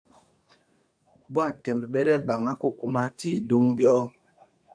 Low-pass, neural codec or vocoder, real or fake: 9.9 kHz; codec, 24 kHz, 1 kbps, SNAC; fake